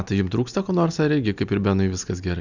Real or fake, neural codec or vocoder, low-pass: real; none; 7.2 kHz